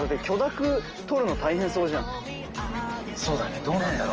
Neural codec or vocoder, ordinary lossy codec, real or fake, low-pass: none; Opus, 24 kbps; real; 7.2 kHz